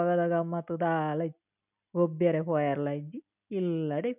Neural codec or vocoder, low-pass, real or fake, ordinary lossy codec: none; 3.6 kHz; real; none